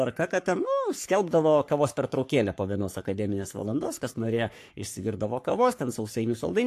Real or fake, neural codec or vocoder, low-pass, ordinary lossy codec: fake; codec, 44.1 kHz, 3.4 kbps, Pupu-Codec; 14.4 kHz; AAC, 64 kbps